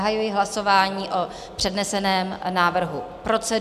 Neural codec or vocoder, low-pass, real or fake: none; 14.4 kHz; real